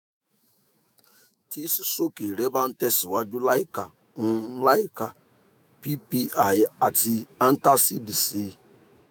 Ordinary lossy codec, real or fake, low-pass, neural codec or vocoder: none; fake; none; autoencoder, 48 kHz, 128 numbers a frame, DAC-VAE, trained on Japanese speech